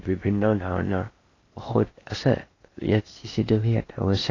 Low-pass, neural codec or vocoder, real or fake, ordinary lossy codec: 7.2 kHz; codec, 16 kHz in and 24 kHz out, 0.8 kbps, FocalCodec, streaming, 65536 codes; fake; AAC, 32 kbps